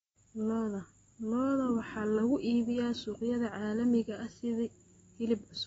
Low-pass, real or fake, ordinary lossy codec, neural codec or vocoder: 19.8 kHz; real; AAC, 24 kbps; none